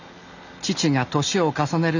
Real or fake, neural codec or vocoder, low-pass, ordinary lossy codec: real; none; 7.2 kHz; none